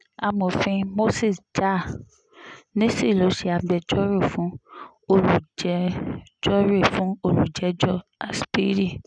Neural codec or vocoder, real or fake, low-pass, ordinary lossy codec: none; real; 9.9 kHz; none